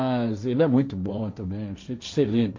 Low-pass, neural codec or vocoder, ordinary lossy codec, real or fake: 7.2 kHz; codec, 16 kHz, 1.1 kbps, Voila-Tokenizer; MP3, 64 kbps; fake